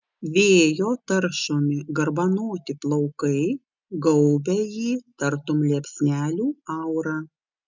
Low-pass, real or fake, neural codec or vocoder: 7.2 kHz; real; none